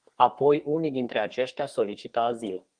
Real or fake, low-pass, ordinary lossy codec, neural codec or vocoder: fake; 9.9 kHz; Opus, 24 kbps; codec, 32 kHz, 1.9 kbps, SNAC